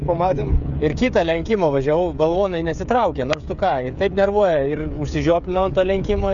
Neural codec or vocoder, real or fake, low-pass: codec, 16 kHz, 8 kbps, FreqCodec, smaller model; fake; 7.2 kHz